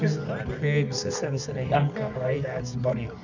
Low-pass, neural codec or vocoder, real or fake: 7.2 kHz; codec, 24 kHz, 0.9 kbps, WavTokenizer, medium music audio release; fake